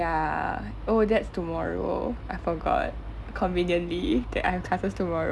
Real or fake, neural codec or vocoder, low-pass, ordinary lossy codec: real; none; none; none